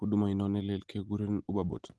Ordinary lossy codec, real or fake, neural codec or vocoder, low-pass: Opus, 16 kbps; real; none; 10.8 kHz